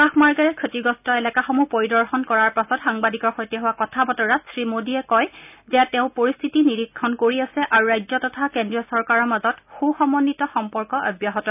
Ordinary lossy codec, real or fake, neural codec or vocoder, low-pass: none; real; none; 3.6 kHz